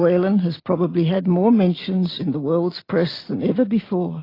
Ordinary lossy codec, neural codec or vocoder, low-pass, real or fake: AAC, 24 kbps; none; 5.4 kHz; real